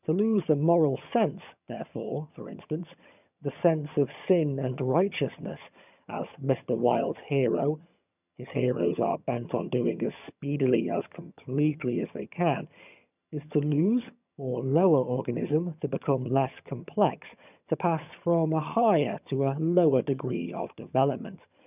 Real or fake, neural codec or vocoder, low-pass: fake; vocoder, 22.05 kHz, 80 mel bands, HiFi-GAN; 3.6 kHz